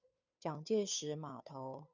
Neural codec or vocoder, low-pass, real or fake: codec, 16 kHz, 2 kbps, FunCodec, trained on Chinese and English, 25 frames a second; 7.2 kHz; fake